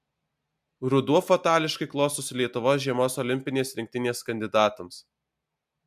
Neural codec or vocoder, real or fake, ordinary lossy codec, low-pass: none; real; MP3, 96 kbps; 14.4 kHz